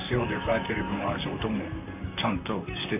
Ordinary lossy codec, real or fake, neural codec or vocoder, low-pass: none; fake; vocoder, 44.1 kHz, 128 mel bands, Pupu-Vocoder; 3.6 kHz